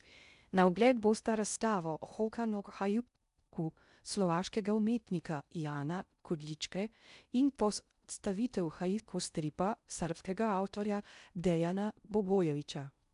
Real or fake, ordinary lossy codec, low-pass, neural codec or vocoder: fake; none; 10.8 kHz; codec, 16 kHz in and 24 kHz out, 0.6 kbps, FocalCodec, streaming, 2048 codes